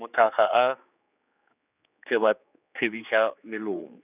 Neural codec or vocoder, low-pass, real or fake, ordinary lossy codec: codec, 16 kHz, 4 kbps, X-Codec, HuBERT features, trained on general audio; 3.6 kHz; fake; none